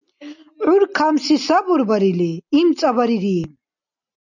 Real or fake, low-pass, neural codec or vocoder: real; 7.2 kHz; none